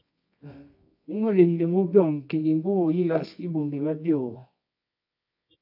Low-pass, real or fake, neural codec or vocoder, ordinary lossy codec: 5.4 kHz; fake; codec, 24 kHz, 0.9 kbps, WavTokenizer, medium music audio release; AAC, 48 kbps